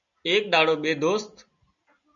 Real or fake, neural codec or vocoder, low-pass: real; none; 7.2 kHz